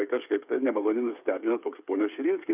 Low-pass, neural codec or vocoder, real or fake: 3.6 kHz; codec, 16 kHz, 8 kbps, FreqCodec, smaller model; fake